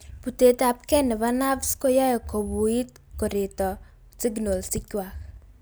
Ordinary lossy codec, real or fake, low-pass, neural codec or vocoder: none; real; none; none